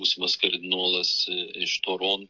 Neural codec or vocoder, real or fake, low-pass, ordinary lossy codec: codec, 16 kHz, 16 kbps, FreqCodec, smaller model; fake; 7.2 kHz; MP3, 48 kbps